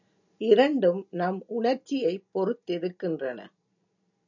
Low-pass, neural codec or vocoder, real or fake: 7.2 kHz; none; real